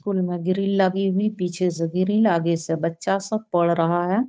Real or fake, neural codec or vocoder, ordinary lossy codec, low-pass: fake; codec, 16 kHz, 8 kbps, FunCodec, trained on Chinese and English, 25 frames a second; none; none